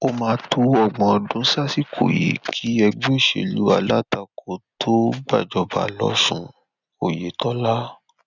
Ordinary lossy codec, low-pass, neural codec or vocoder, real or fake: none; 7.2 kHz; none; real